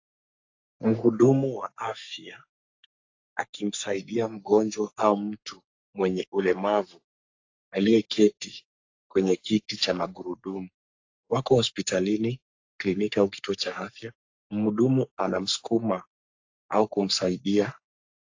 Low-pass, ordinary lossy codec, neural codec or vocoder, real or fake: 7.2 kHz; AAC, 48 kbps; codec, 44.1 kHz, 3.4 kbps, Pupu-Codec; fake